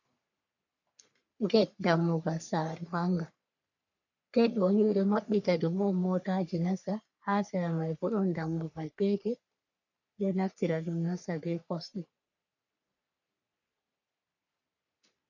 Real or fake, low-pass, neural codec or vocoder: fake; 7.2 kHz; codec, 44.1 kHz, 3.4 kbps, Pupu-Codec